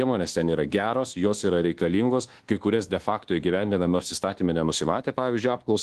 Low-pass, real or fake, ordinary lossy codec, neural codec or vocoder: 10.8 kHz; fake; Opus, 16 kbps; codec, 24 kHz, 1.2 kbps, DualCodec